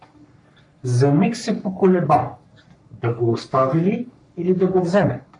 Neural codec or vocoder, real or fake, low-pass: codec, 44.1 kHz, 3.4 kbps, Pupu-Codec; fake; 10.8 kHz